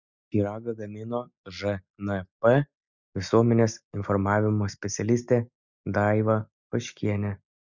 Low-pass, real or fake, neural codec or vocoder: 7.2 kHz; real; none